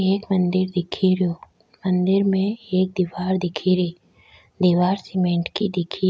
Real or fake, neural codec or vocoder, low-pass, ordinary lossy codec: real; none; none; none